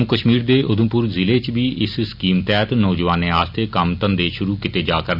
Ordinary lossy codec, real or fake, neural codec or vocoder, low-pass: none; real; none; 5.4 kHz